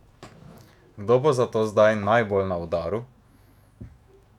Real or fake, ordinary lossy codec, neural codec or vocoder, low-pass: fake; none; autoencoder, 48 kHz, 128 numbers a frame, DAC-VAE, trained on Japanese speech; 19.8 kHz